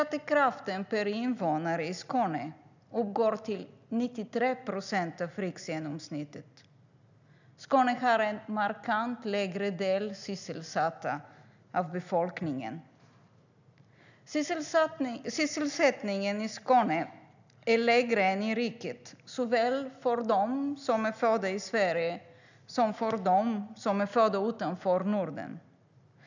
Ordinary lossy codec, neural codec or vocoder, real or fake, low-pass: none; none; real; 7.2 kHz